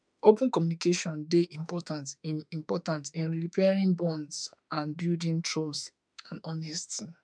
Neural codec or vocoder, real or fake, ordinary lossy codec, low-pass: autoencoder, 48 kHz, 32 numbers a frame, DAC-VAE, trained on Japanese speech; fake; none; 9.9 kHz